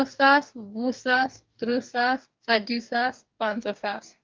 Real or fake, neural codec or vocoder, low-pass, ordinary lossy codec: fake; codec, 44.1 kHz, 2.6 kbps, DAC; 7.2 kHz; Opus, 24 kbps